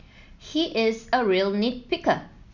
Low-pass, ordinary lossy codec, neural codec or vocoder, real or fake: 7.2 kHz; none; none; real